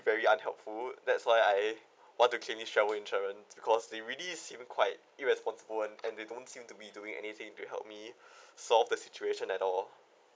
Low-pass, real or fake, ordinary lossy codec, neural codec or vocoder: none; real; none; none